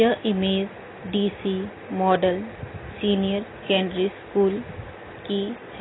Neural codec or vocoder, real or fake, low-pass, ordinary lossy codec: none; real; 7.2 kHz; AAC, 16 kbps